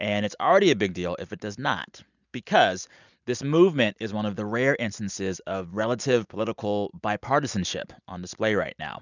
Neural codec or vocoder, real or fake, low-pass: none; real; 7.2 kHz